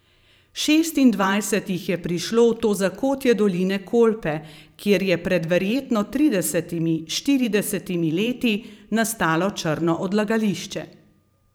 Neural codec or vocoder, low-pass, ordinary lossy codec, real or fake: vocoder, 44.1 kHz, 128 mel bands every 512 samples, BigVGAN v2; none; none; fake